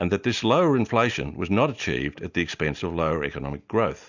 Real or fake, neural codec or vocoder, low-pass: real; none; 7.2 kHz